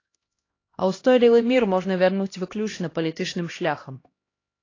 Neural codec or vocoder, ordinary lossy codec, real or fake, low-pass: codec, 16 kHz, 1 kbps, X-Codec, HuBERT features, trained on LibriSpeech; AAC, 32 kbps; fake; 7.2 kHz